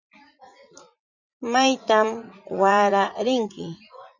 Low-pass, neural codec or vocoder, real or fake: 7.2 kHz; vocoder, 44.1 kHz, 80 mel bands, Vocos; fake